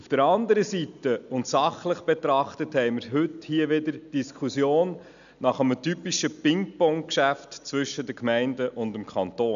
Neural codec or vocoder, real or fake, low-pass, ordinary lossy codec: none; real; 7.2 kHz; none